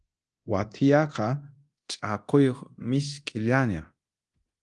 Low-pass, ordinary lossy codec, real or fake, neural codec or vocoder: 10.8 kHz; Opus, 24 kbps; fake; codec, 24 kHz, 0.9 kbps, DualCodec